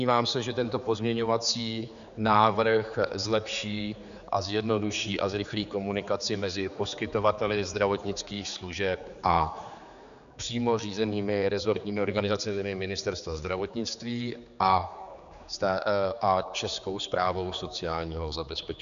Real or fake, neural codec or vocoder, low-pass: fake; codec, 16 kHz, 4 kbps, X-Codec, HuBERT features, trained on general audio; 7.2 kHz